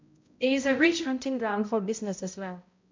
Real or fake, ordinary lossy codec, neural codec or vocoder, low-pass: fake; MP3, 48 kbps; codec, 16 kHz, 0.5 kbps, X-Codec, HuBERT features, trained on balanced general audio; 7.2 kHz